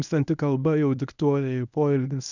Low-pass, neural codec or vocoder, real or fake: 7.2 kHz; codec, 24 kHz, 0.9 kbps, WavTokenizer, medium speech release version 1; fake